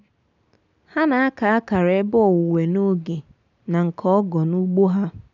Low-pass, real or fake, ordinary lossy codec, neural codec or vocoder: 7.2 kHz; real; none; none